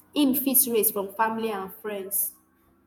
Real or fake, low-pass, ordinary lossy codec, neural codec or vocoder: fake; none; none; vocoder, 48 kHz, 128 mel bands, Vocos